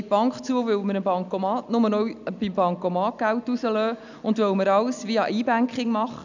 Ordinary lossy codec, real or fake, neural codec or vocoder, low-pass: none; real; none; 7.2 kHz